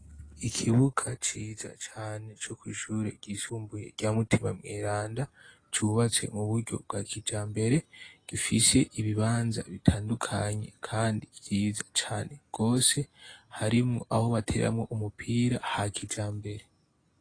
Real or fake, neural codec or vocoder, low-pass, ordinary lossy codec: real; none; 9.9 kHz; AAC, 32 kbps